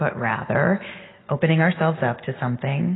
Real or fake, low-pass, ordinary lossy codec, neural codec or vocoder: real; 7.2 kHz; AAC, 16 kbps; none